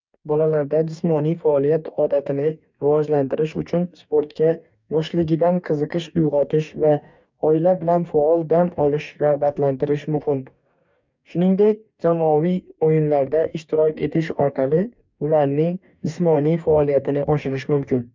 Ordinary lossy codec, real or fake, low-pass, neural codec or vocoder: MP3, 64 kbps; fake; 7.2 kHz; codec, 44.1 kHz, 2.6 kbps, DAC